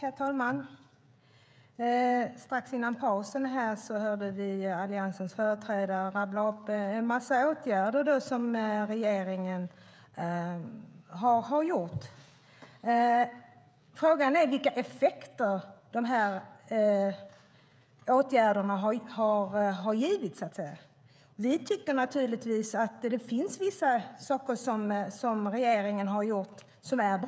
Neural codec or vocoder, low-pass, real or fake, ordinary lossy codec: codec, 16 kHz, 16 kbps, FreqCodec, smaller model; none; fake; none